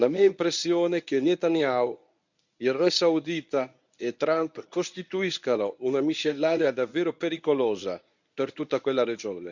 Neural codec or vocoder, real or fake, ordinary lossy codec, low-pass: codec, 24 kHz, 0.9 kbps, WavTokenizer, medium speech release version 1; fake; none; 7.2 kHz